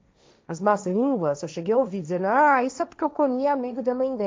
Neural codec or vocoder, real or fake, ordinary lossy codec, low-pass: codec, 16 kHz, 1.1 kbps, Voila-Tokenizer; fake; none; none